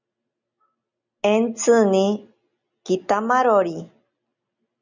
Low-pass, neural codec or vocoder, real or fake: 7.2 kHz; none; real